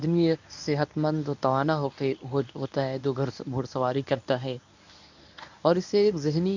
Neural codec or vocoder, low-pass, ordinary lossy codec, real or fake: codec, 24 kHz, 0.9 kbps, WavTokenizer, medium speech release version 1; 7.2 kHz; none; fake